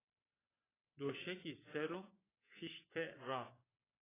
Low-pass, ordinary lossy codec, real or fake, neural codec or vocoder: 3.6 kHz; AAC, 16 kbps; fake; vocoder, 24 kHz, 100 mel bands, Vocos